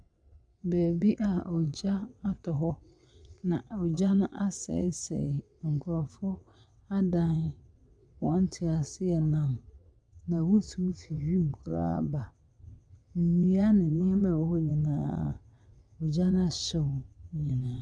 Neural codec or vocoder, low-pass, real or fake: vocoder, 22.05 kHz, 80 mel bands, WaveNeXt; 9.9 kHz; fake